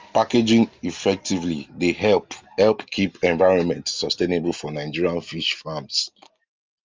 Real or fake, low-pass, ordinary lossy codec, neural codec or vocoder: real; 7.2 kHz; Opus, 32 kbps; none